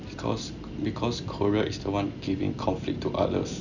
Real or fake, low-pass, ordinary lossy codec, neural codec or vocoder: real; 7.2 kHz; none; none